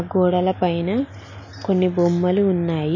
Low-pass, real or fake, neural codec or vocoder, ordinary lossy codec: 7.2 kHz; real; none; MP3, 32 kbps